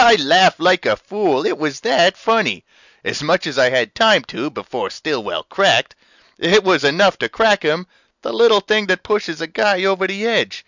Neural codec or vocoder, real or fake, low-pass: none; real; 7.2 kHz